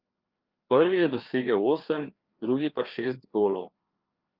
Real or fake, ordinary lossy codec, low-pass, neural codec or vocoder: fake; Opus, 32 kbps; 5.4 kHz; codec, 16 kHz, 2 kbps, FreqCodec, larger model